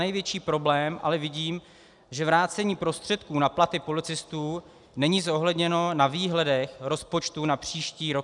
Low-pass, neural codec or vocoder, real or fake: 10.8 kHz; none; real